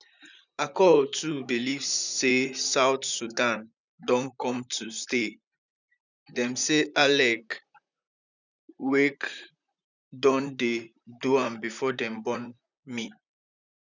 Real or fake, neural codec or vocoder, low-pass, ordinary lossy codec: fake; vocoder, 44.1 kHz, 128 mel bands, Pupu-Vocoder; 7.2 kHz; none